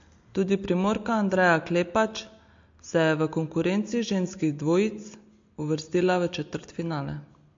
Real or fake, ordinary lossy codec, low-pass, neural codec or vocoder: real; MP3, 48 kbps; 7.2 kHz; none